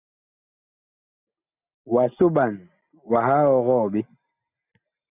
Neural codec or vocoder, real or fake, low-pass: none; real; 3.6 kHz